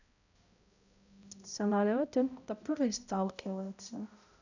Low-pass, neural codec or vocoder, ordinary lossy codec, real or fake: 7.2 kHz; codec, 16 kHz, 0.5 kbps, X-Codec, HuBERT features, trained on balanced general audio; none; fake